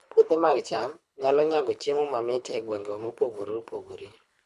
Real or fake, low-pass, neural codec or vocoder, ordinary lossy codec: fake; none; codec, 24 kHz, 3 kbps, HILCodec; none